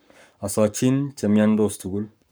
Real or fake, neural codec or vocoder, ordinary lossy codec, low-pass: fake; codec, 44.1 kHz, 7.8 kbps, Pupu-Codec; none; none